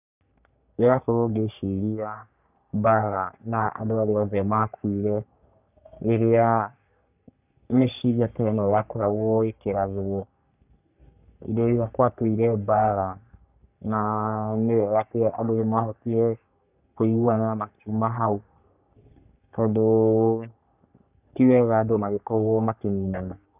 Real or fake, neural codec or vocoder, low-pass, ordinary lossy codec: fake; codec, 44.1 kHz, 3.4 kbps, Pupu-Codec; 3.6 kHz; none